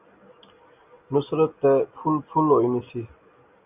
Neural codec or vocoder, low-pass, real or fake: none; 3.6 kHz; real